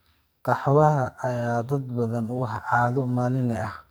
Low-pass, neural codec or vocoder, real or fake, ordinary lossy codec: none; codec, 44.1 kHz, 2.6 kbps, SNAC; fake; none